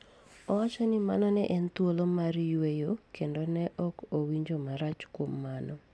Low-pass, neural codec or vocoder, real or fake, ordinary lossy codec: none; none; real; none